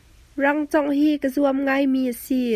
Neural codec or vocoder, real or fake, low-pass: none; real; 14.4 kHz